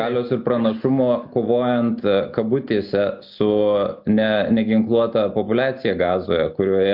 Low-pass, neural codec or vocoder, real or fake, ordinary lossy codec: 5.4 kHz; none; real; MP3, 48 kbps